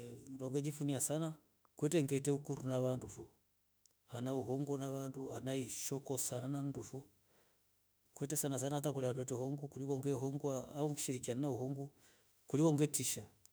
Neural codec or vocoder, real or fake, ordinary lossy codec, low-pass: autoencoder, 48 kHz, 32 numbers a frame, DAC-VAE, trained on Japanese speech; fake; none; none